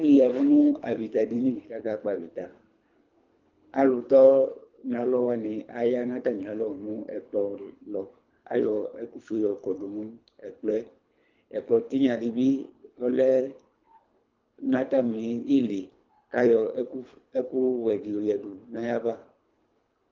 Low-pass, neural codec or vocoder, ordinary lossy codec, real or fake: 7.2 kHz; codec, 24 kHz, 3 kbps, HILCodec; Opus, 24 kbps; fake